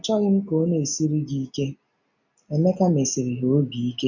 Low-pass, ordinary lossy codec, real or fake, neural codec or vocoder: 7.2 kHz; none; real; none